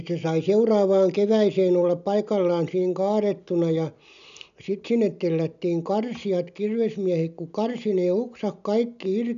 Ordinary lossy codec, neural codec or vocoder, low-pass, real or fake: none; none; 7.2 kHz; real